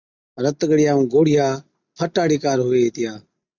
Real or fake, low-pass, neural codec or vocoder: real; 7.2 kHz; none